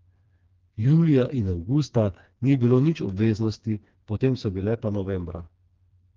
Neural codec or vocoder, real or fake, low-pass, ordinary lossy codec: codec, 16 kHz, 2 kbps, FreqCodec, smaller model; fake; 7.2 kHz; Opus, 32 kbps